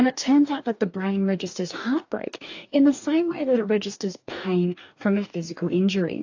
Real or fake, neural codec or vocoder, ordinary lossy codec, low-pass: fake; codec, 44.1 kHz, 2.6 kbps, DAC; AAC, 48 kbps; 7.2 kHz